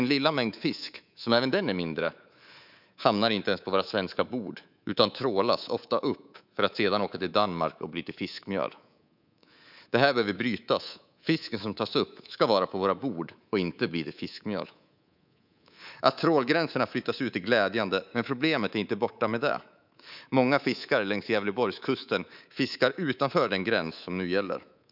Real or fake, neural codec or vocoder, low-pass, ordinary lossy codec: fake; codec, 24 kHz, 3.1 kbps, DualCodec; 5.4 kHz; none